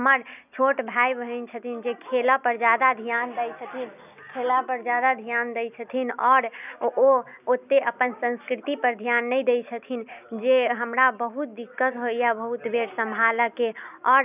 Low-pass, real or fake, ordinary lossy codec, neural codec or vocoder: 3.6 kHz; real; none; none